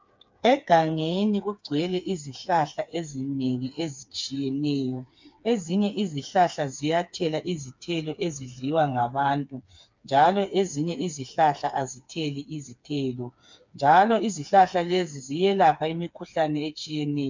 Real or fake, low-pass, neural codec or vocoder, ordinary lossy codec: fake; 7.2 kHz; codec, 16 kHz, 4 kbps, FreqCodec, smaller model; MP3, 64 kbps